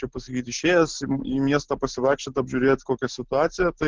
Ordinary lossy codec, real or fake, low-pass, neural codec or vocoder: Opus, 16 kbps; real; 7.2 kHz; none